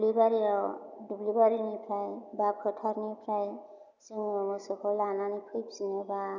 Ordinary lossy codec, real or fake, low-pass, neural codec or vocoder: none; real; 7.2 kHz; none